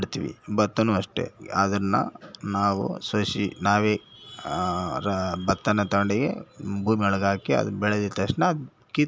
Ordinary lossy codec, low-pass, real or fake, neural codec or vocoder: none; none; real; none